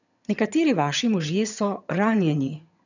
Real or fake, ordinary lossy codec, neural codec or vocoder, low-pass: fake; none; vocoder, 22.05 kHz, 80 mel bands, HiFi-GAN; 7.2 kHz